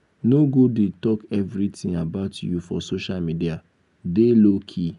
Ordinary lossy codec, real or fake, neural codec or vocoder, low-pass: none; real; none; 10.8 kHz